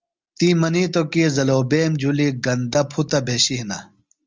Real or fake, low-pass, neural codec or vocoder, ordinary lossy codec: real; 7.2 kHz; none; Opus, 32 kbps